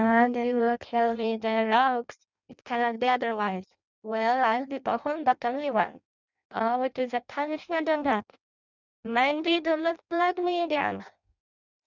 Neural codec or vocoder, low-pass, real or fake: codec, 16 kHz in and 24 kHz out, 0.6 kbps, FireRedTTS-2 codec; 7.2 kHz; fake